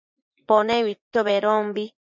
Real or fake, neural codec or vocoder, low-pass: fake; vocoder, 24 kHz, 100 mel bands, Vocos; 7.2 kHz